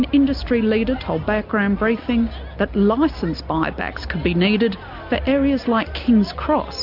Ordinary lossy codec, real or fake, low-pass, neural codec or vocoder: MP3, 48 kbps; real; 5.4 kHz; none